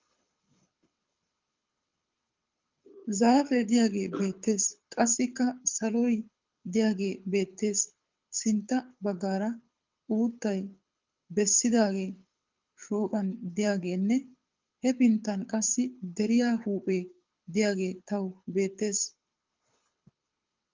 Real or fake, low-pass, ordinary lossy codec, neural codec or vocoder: fake; 7.2 kHz; Opus, 24 kbps; codec, 24 kHz, 6 kbps, HILCodec